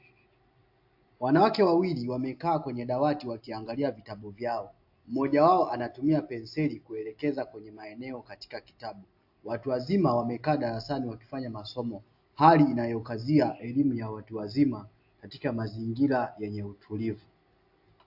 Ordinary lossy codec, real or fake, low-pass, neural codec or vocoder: AAC, 48 kbps; real; 5.4 kHz; none